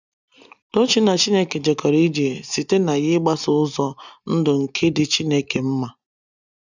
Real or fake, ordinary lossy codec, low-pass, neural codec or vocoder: real; none; 7.2 kHz; none